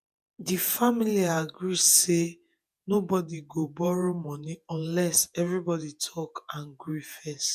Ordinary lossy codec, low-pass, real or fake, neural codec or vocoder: none; 14.4 kHz; fake; vocoder, 48 kHz, 128 mel bands, Vocos